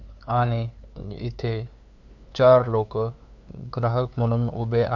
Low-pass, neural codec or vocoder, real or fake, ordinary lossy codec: 7.2 kHz; codec, 16 kHz, 2 kbps, FunCodec, trained on LibriTTS, 25 frames a second; fake; none